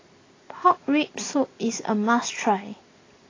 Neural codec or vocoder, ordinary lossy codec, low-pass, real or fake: none; AAC, 32 kbps; 7.2 kHz; real